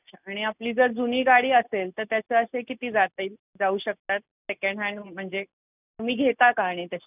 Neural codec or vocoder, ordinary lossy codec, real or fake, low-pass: none; none; real; 3.6 kHz